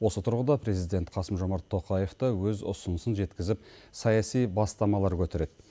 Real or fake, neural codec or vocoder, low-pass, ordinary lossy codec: real; none; none; none